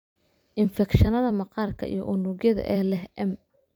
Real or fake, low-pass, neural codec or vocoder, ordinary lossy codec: fake; none; vocoder, 44.1 kHz, 128 mel bands every 256 samples, BigVGAN v2; none